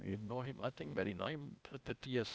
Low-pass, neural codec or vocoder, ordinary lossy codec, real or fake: none; codec, 16 kHz, 0.8 kbps, ZipCodec; none; fake